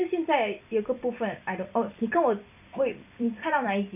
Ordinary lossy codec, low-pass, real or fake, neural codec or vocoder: none; 3.6 kHz; fake; vocoder, 44.1 kHz, 128 mel bands every 256 samples, BigVGAN v2